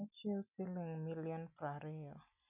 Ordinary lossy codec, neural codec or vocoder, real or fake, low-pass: none; none; real; 3.6 kHz